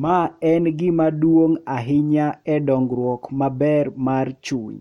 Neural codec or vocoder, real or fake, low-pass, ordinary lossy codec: none; real; 19.8 kHz; MP3, 64 kbps